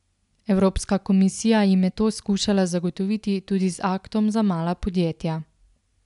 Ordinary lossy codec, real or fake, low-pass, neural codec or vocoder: none; real; 10.8 kHz; none